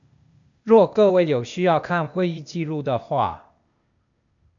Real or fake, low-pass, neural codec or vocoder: fake; 7.2 kHz; codec, 16 kHz, 0.8 kbps, ZipCodec